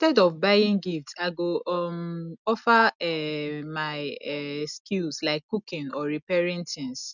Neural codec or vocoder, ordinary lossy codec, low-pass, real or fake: none; none; 7.2 kHz; real